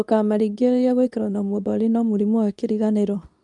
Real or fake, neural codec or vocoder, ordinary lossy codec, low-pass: fake; codec, 24 kHz, 0.9 kbps, WavTokenizer, medium speech release version 2; none; 10.8 kHz